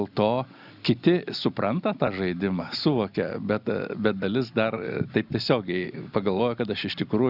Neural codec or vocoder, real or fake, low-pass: none; real; 5.4 kHz